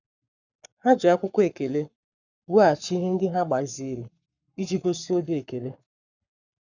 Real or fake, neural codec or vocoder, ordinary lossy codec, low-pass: fake; codec, 44.1 kHz, 7.8 kbps, Pupu-Codec; none; 7.2 kHz